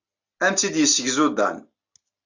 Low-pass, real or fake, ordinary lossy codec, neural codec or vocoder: 7.2 kHz; real; AAC, 48 kbps; none